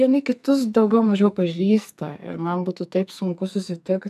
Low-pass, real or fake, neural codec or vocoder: 14.4 kHz; fake; codec, 44.1 kHz, 2.6 kbps, SNAC